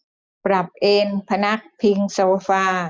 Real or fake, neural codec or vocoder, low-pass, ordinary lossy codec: real; none; none; none